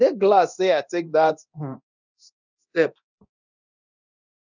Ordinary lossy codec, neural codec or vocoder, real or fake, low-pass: none; codec, 24 kHz, 0.9 kbps, DualCodec; fake; 7.2 kHz